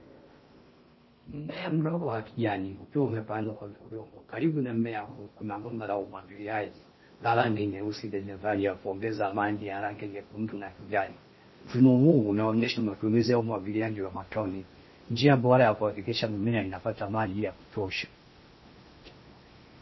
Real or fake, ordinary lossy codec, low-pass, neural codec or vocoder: fake; MP3, 24 kbps; 7.2 kHz; codec, 16 kHz in and 24 kHz out, 0.6 kbps, FocalCodec, streaming, 4096 codes